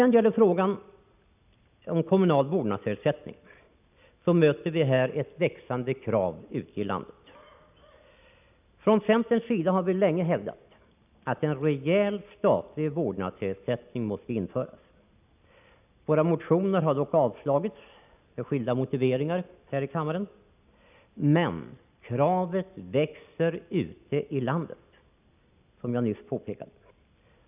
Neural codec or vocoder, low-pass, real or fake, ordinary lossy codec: none; 3.6 kHz; real; none